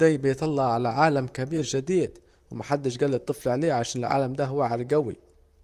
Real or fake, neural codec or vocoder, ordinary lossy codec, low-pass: fake; vocoder, 44.1 kHz, 128 mel bands, Pupu-Vocoder; Opus, 32 kbps; 14.4 kHz